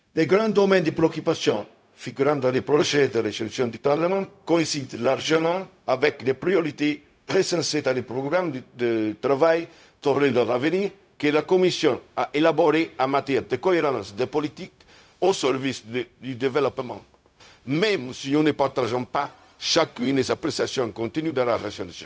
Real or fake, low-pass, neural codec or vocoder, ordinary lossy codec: fake; none; codec, 16 kHz, 0.4 kbps, LongCat-Audio-Codec; none